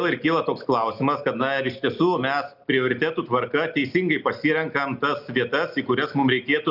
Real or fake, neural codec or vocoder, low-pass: real; none; 5.4 kHz